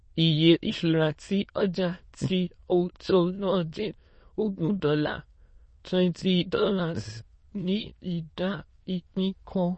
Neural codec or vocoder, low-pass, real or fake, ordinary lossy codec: autoencoder, 22.05 kHz, a latent of 192 numbers a frame, VITS, trained on many speakers; 9.9 kHz; fake; MP3, 32 kbps